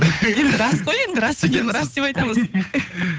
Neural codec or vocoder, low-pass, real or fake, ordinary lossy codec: codec, 16 kHz, 8 kbps, FunCodec, trained on Chinese and English, 25 frames a second; none; fake; none